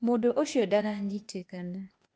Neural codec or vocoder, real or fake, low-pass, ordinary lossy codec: codec, 16 kHz, 0.8 kbps, ZipCodec; fake; none; none